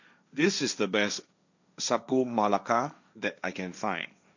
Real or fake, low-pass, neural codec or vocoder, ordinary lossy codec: fake; 7.2 kHz; codec, 16 kHz, 1.1 kbps, Voila-Tokenizer; none